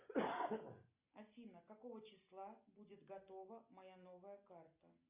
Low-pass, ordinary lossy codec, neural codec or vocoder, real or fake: 3.6 kHz; Opus, 64 kbps; none; real